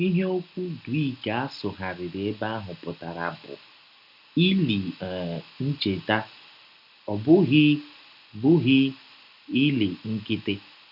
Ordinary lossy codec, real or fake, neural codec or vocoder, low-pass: none; real; none; 5.4 kHz